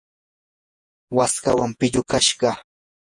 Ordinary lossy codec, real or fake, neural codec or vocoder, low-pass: Opus, 64 kbps; fake; vocoder, 48 kHz, 128 mel bands, Vocos; 10.8 kHz